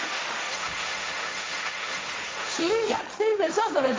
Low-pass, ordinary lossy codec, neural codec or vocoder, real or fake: none; none; codec, 16 kHz, 1.1 kbps, Voila-Tokenizer; fake